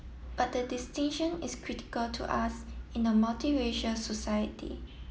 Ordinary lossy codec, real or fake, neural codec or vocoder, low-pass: none; real; none; none